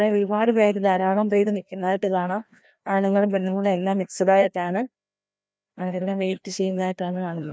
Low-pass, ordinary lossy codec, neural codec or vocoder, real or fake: none; none; codec, 16 kHz, 1 kbps, FreqCodec, larger model; fake